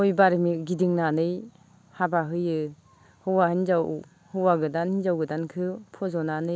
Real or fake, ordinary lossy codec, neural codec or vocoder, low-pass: real; none; none; none